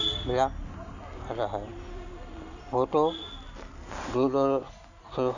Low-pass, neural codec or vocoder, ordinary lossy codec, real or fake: 7.2 kHz; none; none; real